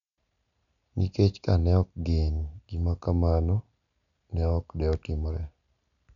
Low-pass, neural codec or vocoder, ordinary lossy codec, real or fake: 7.2 kHz; none; none; real